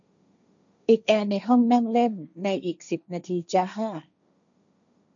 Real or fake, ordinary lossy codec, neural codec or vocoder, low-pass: fake; none; codec, 16 kHz, 1.1 kbps, Voila-Tokenizer; 7.2 kHz